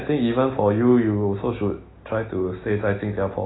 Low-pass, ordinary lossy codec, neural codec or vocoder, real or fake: 7.2 kHz; AAC, 16 kbps; none; real